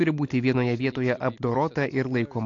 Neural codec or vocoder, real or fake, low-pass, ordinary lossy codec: none; real; 7.2 kHz; AAC, 48 kbps